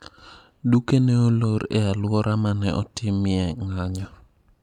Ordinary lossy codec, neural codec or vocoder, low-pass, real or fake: none; none; 19.8 kHz; real